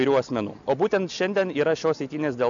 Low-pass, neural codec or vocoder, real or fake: 7.2 kHz; none; real